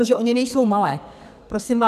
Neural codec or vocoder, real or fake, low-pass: codec, 44.1 kHz, 2.6 kbps, SNAC; fake; 14.4 kHz